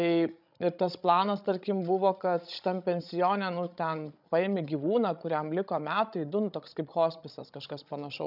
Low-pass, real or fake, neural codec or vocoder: 5.4 kHz; fake; codec, 16 kHz, 16 kbps, FreqCodec, larger model